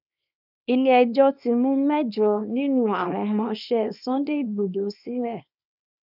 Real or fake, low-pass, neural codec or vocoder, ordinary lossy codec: fake; 5.4 kHz; codec, 24 kHz, 0.9 kbps, WavTokenizer, small release; none